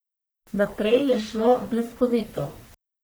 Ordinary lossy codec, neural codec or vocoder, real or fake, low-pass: none; codec, 44.1 kHz, 1.7 kbps, Pupu-Codec; fake; none